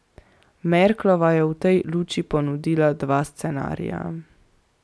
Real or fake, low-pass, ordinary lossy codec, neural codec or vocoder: real; none; none; none